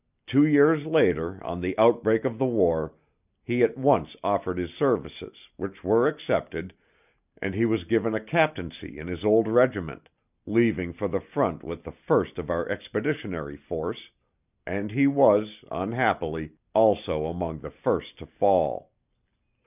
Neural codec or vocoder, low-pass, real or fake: none; 3.6 kHz; real